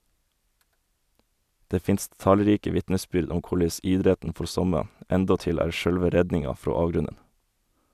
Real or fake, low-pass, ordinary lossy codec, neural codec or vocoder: fake; 14.4 kHz; none; vocoder, 44.1 kHz, 128 mel bands every 256 samples, BigVGAN v2